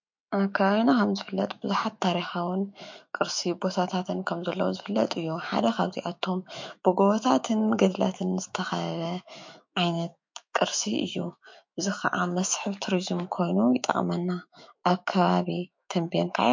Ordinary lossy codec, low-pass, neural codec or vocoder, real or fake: MP3, 48 kbps; 7.2 kHz; autoencoder, 48 kHz, 128 numbers a frame, DAC-VAE, trained on Japanese speech; fake